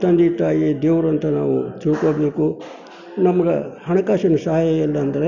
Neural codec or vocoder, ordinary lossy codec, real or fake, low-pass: none; none; real; 7.2 kHz